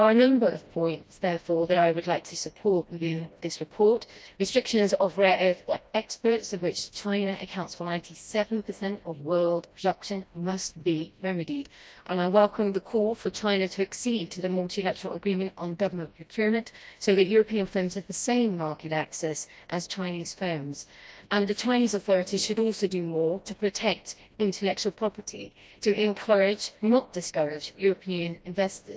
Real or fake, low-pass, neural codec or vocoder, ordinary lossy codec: fake; none; codec, 16 kHz, 1 kbps, FreqCodec, smaller model; none